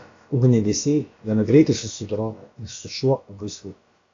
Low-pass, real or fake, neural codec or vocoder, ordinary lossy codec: 7.2 kHz; fake; codec, 16 kHz, about 1 kbps, DyCAST, with the encoder's durations; AAC, 48 kbps